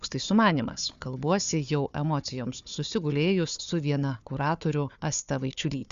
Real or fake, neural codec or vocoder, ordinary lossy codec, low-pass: fake; codec, 16 kHz, 4 kbps, FunCodec, trained on Chinese and English, 50 frames a second; Opus, 64 kbps; 7.2 kHz